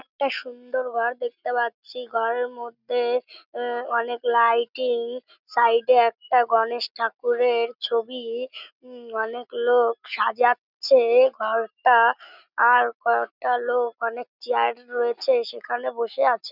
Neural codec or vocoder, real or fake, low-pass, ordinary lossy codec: none; real; 5.4 kHz; none